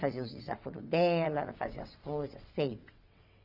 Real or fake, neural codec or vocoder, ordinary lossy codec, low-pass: fake; vocoder, 22.05 kHz, 80 mel bands, WaveNeXt; none; 5.4 kHz